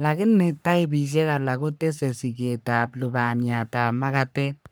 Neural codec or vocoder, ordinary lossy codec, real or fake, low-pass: codec, 44.1 kHz, 3.4 kbps, Pupu-Codec; none; fake; none